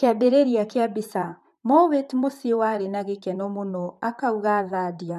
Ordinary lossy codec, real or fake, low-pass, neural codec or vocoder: MP3, 96 kbps; fake; 14.4 kHz; vocoder, 44.1 kHz, 128 mel bands, Pupu-Vocoder